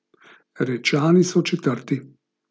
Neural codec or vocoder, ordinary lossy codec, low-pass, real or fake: none; none; none; real